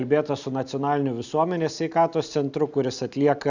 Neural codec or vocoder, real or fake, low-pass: none; real; 7.2 kHz